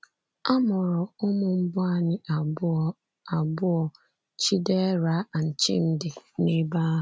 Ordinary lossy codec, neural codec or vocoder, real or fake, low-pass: none; none; real; none